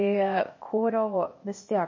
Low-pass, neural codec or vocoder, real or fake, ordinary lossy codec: 7.2 kHz; codec, 16 kHz, 0.8 kbps, ZipCodec; fake; MP3, 32 kbps